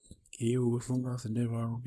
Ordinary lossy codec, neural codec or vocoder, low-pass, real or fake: none; codec, 24 kHz, 0.9 kbps, WavTokenizer, small release; none; fake